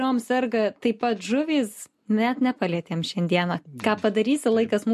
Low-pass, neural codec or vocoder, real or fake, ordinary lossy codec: 14.4 kHz; none; real; MP3, 64 kbps